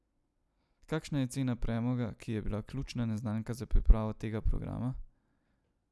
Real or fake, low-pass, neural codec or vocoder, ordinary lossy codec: real; none; none; none